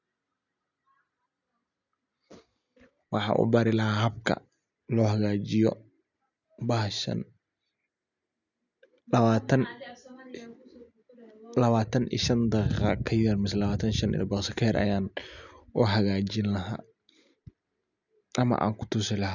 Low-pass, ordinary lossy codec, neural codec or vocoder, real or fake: 7.2 kHz; none; none; real